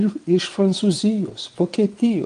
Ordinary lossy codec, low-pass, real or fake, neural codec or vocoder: Opus, 24 kbps; 9.9 kHz; fake; vocoder, 22.05 kHz, 80 mel bands, WaveNeXt